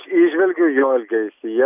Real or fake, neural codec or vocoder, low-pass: real; none; 3.6 kHz